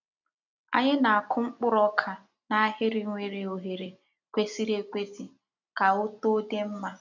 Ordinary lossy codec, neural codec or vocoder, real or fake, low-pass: none; none; real; 7.2 kHz